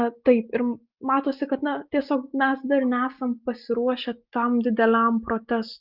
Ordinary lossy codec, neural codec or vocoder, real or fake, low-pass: Opus, 32 kbps; none; real; 5.4 kHz